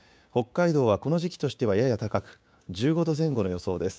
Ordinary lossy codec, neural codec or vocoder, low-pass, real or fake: none; codec, 16 kHz, 6 kbps, DAC; none; fake